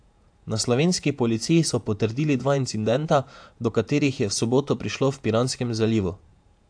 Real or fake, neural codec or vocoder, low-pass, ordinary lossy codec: fake; vocoder, 48 kHz, 128 mel bands, Vocos; 9.9 kHz; AAC, 64 kbps